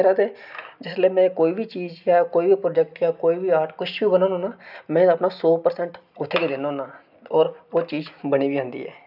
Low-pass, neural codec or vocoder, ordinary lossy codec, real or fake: 5.4 kHz; none; none; real